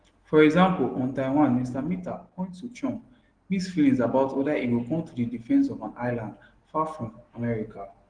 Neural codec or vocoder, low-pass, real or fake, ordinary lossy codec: none; 9.9 kHz; real; Opus, 16 kbps